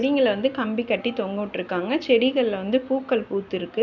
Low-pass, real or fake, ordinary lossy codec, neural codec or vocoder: 7.2 kHz; real; none; none